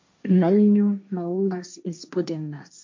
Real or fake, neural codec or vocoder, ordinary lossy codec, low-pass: fake; codec, 16 kHz, 1.1 kbps, Voila-Tokenizer; MP3, 48 kbps; 7.2 kHz